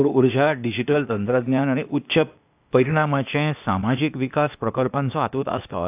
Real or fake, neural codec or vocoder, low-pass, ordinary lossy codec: fake; codec, 16 kHz, 0.8 kbps, ZipCodec; 3.6 kHz; AAC, 32 kbps